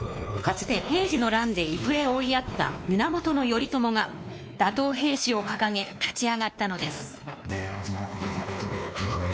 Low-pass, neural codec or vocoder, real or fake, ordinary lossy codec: none; codec, 16 kHz, 2 kbps, X-Codec, WavLM features, trained on Multilingual LibriSpeech; fake; none